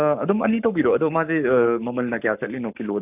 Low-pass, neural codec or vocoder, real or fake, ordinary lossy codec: 3.6 kHz; codec, 44.1 kHz, 7.8 kbps, Pupu-Codec; fake; none